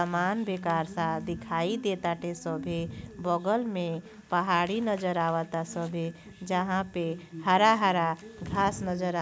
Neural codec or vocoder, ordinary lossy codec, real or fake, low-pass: none; none; real; none